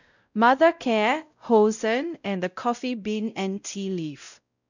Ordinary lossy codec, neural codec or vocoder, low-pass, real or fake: none; codec, 16 kHz, 0.5 kbps, X-Codec, WavLM features, trained on Multilingual LibriSpeech; 7.2 kHz; fake